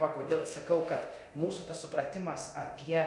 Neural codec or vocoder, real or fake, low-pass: codec, 24 kHz, 0.9 kbps, DualCodec; fake; 10.8 kHz